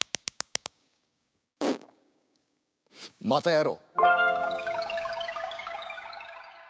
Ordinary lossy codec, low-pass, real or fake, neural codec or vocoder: none; none; fake; codec, 16 kHz, 6 kbps, DAC